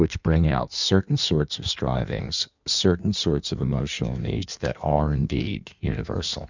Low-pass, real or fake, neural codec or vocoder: 7.2 kHz; fake; codec, 16 kHz in and 24 kHz out, 1.1 kbps, FireRedTTS-2 codec